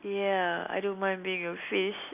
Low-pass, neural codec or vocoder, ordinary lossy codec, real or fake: 3.6 kHz; none; none; real